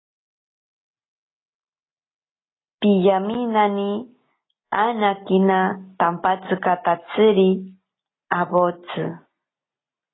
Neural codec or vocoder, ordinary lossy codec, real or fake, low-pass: none; AAC, 16 kbps; real; 7.2 kHz